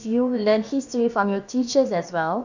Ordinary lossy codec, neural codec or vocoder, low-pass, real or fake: none; codec, 16 kHz, about 1 kbps, DyCAST, with the encoder's durations; 7.2 kHz; fake